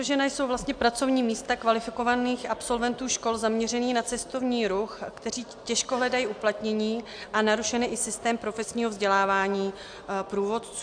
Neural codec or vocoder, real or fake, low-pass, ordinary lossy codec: none; real; 9.9 kHz; AAC, 64 kbps